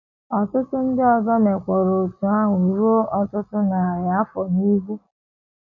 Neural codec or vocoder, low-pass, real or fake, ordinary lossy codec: none; 7.2 kHz; real; none